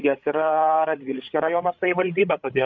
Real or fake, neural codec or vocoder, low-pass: fake; codec, 16 kHz, 8 kbps, FreqCodec, larger model; 7.2 kHz